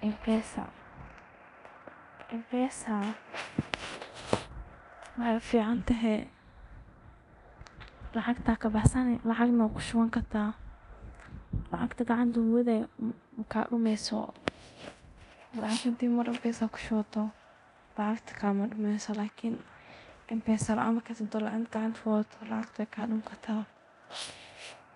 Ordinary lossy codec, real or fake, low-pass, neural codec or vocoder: none; fake; 10.8 kHz; codec, 24 kHz, 0.9 kbps, DualCodec